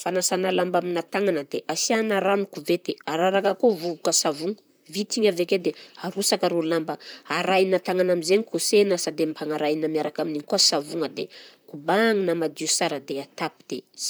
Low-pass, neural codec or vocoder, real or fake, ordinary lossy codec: none; vocoder, 44.1 kHz, 128 mel bands, Pupu-Vocoder; fake; none